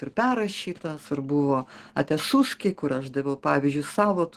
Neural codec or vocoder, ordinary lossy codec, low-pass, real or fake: none; Opus, 16 kbps; 14.4 kHz; real